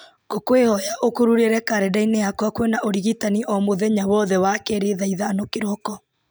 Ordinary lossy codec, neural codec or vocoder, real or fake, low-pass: none; none; real; none